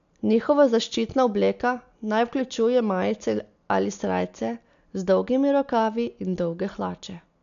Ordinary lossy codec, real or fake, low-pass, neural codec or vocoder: none; real; 7.2 kHz; none